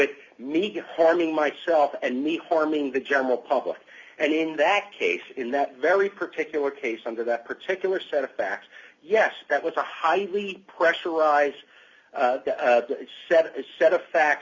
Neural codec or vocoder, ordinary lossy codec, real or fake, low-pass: none; Opus, 64 kbps; real; 7.2 kHz